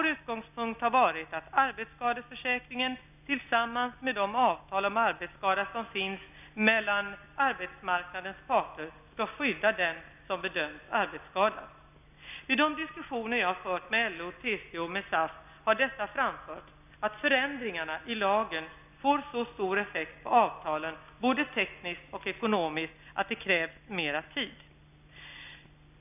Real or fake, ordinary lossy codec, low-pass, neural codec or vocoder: real; none; 3.6 kHz; none